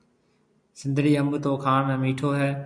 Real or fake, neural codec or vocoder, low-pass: real; none; 9.9 kHz